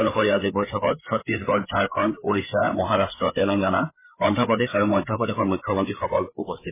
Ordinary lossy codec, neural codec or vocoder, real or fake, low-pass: MP3, 16 kbps; codec, 16 kHz, 4 kbps, FreqCodec, larger model; fake; 3.6 kHz